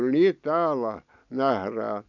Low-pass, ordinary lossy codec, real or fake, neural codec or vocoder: 7.2 kHz; none; real; none